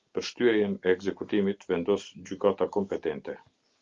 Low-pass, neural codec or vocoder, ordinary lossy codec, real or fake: 7.2 kHz; none; Opus, 32 kbps; real